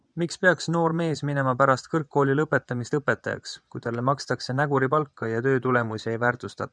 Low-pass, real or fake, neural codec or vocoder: 9.9 kHz; fake; vocoder, 24 kHz, 100 mel bands, Vocos